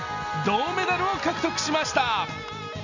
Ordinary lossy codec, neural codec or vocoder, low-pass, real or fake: none; none; 7.2 kHz; real